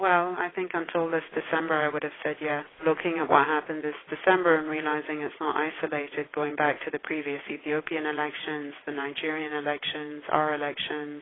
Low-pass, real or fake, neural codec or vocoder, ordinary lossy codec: 7.2 kHz; fake; vocoder, 22.05 kHz, 80 mel bands, WaveNeXt; AAC, 16 kbps